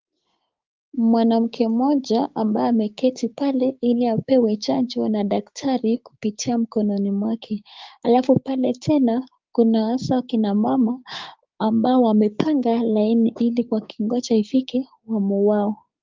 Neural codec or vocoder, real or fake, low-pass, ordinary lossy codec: codec, 16 kHz, 6 kbps, DAC; fake; 7.2 kHz; Opus, 32 kbps